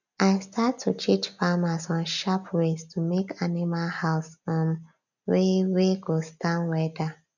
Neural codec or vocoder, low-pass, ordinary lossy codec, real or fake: none; 7.2 kHz; none; real